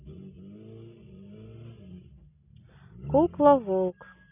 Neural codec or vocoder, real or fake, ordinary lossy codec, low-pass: none; real; none; 3.6 kHz